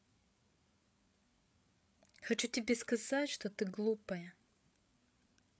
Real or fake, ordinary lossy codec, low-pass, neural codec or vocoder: fake; none; none; codec, 16 kHz, 8 kbps, FreqCodec, larger model